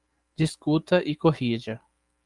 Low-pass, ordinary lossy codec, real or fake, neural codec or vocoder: 10.8 kHz; Opus, 32 kbps; real; none